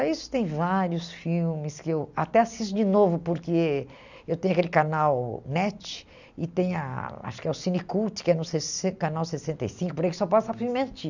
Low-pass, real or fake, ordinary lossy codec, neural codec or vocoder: 7.2 kHz; real; none; none